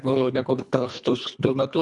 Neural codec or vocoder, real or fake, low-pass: codec, 24 kHz, 1.5 kbps, HILCodec; fake; 10.8 kHz